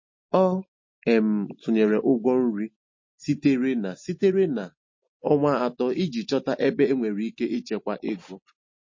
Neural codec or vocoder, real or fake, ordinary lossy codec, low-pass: none; real; MP3, 32 kbps; 7.2 kHz